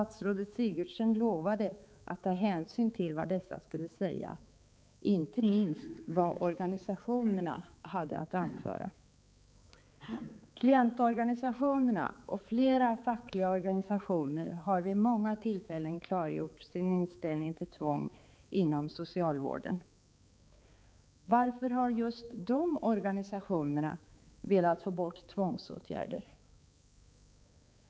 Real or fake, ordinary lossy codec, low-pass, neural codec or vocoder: fake; none; none; codec, 16 kHz, 4 kbps, X-Codec, HuBERT features, trained on balanced general audio